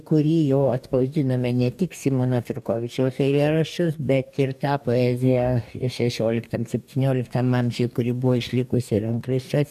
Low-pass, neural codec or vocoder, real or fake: 14.4 kHz; codec, 44.1 kHz, 2.6 kbps, DAC; fake